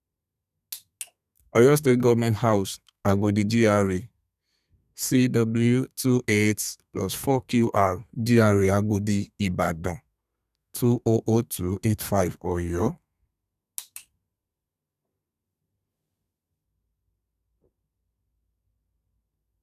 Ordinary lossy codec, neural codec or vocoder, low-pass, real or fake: none; codec, 32 kHz, 1.9 kbps, SNAC; 14.4 kHz; fake